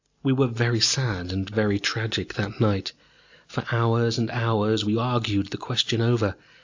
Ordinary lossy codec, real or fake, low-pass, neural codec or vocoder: AAC, 48 kbps; real; 7.2 kHz; none